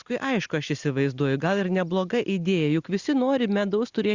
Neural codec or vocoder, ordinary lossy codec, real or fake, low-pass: none; Opus, 64 kbps; real; 7.2 kHz